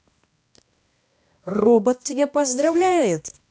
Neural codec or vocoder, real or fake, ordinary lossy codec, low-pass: codec, 16 kHz, 1 kbps, X-Codec, HuBERT features, trained on balanced general audio; fake; none; none